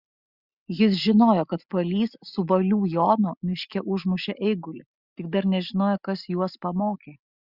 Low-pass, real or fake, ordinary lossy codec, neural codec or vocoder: 5.4 kHz; real; Opus, 64 kbps; none